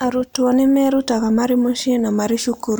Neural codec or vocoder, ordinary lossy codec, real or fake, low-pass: none; none; real; none